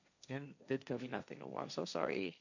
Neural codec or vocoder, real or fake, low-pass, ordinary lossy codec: codec, 16 kHz, 1.1 kbps, Voila-Tokenizer; fake; 7.2 kHz; none